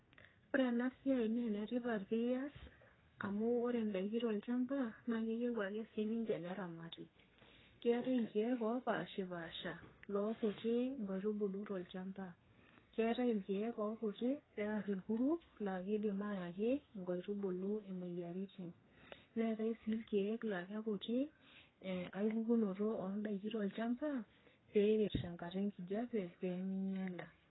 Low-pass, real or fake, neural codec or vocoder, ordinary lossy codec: 7.2 kHz; fake; codec, 32 kHz, 1.9 kbps, SNAC; AAC, 16 kbps